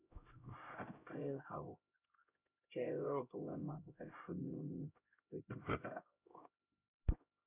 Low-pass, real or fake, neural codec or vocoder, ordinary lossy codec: 3.6 kHz; fake; codec, 16 kHz, 0.5 kbps, X-Codec, HuBERT features, trained on LibriSpeech; none